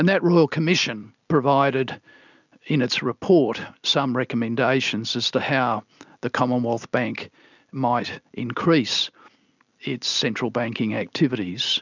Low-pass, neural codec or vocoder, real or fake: 7.2 kHz; none; real